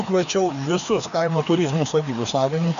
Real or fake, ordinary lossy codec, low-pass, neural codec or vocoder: fake; MP3, 96 kbps; 7.2 kHz; codec, 16 kHz, 2 kbps, FreqCodec, larger model